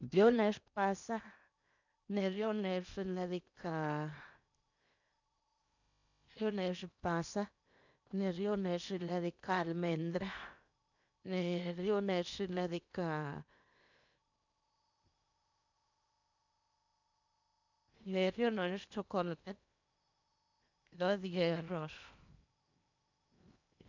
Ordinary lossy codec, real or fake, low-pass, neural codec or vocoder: none; fake; 7.2 kHz; codec, 16 kHz in and 24 kHz out, 0.8 kbps, FocalCodec, streaming, 65536 codes